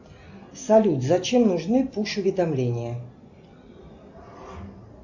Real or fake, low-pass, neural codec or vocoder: real; 7.2 kHz; none